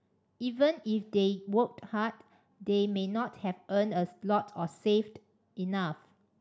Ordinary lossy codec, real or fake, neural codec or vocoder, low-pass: none; real; none; none